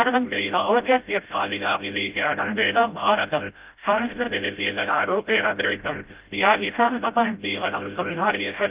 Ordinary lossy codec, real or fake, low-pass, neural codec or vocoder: Opus, 24 kbps; fake; 3.6 kHz; codec, 16 kHz, 0.5 kbps, FreqCodec, smaller model